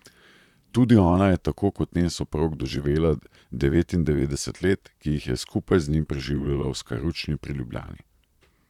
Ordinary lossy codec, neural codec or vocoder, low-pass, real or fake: none; vocoder, 44.1 kHz, 128 mel bands, Pupu-Vocoder; 19.8 kHz; fake